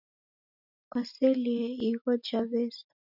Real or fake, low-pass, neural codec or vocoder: real; 5.4 kHz; none